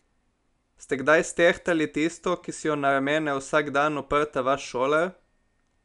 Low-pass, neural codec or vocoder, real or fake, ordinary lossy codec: 10.8 kHz; none; real; none